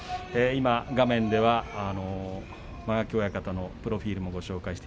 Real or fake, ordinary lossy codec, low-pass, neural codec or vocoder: real; none; none; none